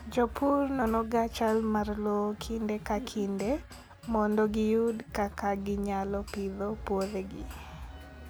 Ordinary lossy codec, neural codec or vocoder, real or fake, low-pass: none; none; real; none